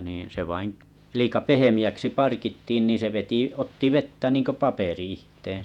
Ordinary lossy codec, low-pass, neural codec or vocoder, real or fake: none; 19.8 kHz; vocoder, 48 kHz, 128 mel bands, Vocos; fake